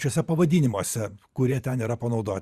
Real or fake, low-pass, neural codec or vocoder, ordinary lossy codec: fake; 14.4 kHz; vocoder, 44.1 kHz, 128 mel bands every 512 samples, BigVGAN v2; Opus, 64 kbps